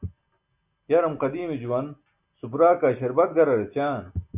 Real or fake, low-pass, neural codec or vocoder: real; 3.6 kHz; none